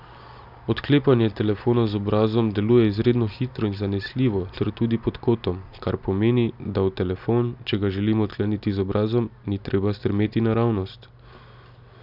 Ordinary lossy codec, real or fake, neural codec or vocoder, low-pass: none; real; none; 5.4 kHz